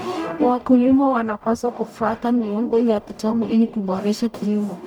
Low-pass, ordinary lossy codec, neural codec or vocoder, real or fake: 19.8 kHz; none; codec, 44.1 kHz, 0.9 kbps, DAC; fake